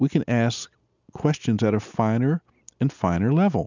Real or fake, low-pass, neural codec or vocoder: real; 7.2 kHz; none